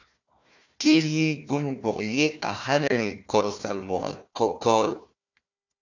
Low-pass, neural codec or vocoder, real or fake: 7.2 kHz; codec, 16 kHz, 1 kbps, FunCodec, trained on Chinese and English, 50 frames a second; fake